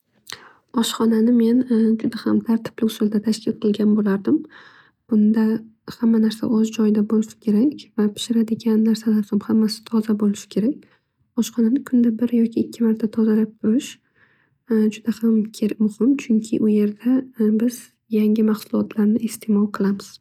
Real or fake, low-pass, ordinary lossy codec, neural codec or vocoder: real; 19.8 kHz; none; none